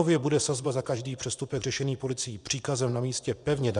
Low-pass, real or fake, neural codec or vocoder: 10.8 kHz; fake; vocoder, 24 kHz, 100 mel bands, Vocos